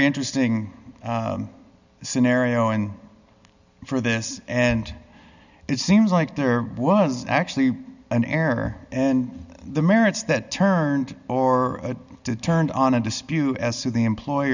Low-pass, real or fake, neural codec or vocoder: 7.2 kHz; real; none